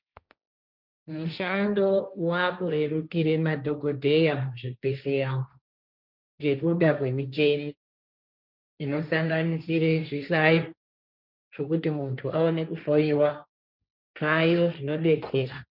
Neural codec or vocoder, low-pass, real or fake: codec, 16 kHz, 1.1 kbps, Voila-Tokenizer; 5.4 kHz; fake